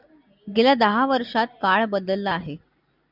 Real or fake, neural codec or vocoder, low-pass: real; none; 5.4 kHz